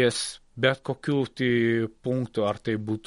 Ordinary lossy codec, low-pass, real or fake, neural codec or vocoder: MP3, 48 kbps; 14.4 kHz; real; none